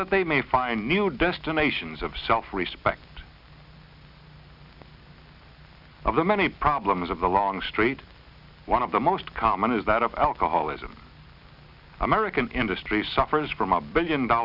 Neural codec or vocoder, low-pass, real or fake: none; 5.4 kHz; real